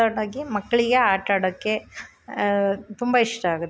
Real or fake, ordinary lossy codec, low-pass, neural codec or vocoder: real; none; none; none